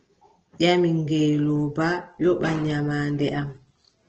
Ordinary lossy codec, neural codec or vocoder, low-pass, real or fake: Opus, 16 kbps; none; 7.2 kHz; real